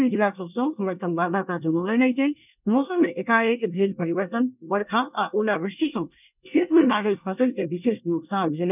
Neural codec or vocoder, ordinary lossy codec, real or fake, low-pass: codec, 24 kHz, 1 kbps, SNAC; none; fake; 3.6 kHz